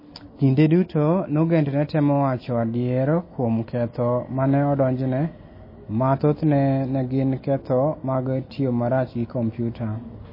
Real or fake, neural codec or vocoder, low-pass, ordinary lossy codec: real; none; 5.4 kHz; MP3, 24 kbps